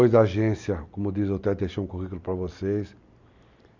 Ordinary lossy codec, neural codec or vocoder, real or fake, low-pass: Opus, 64 kbps; none; real; 7.2 kHz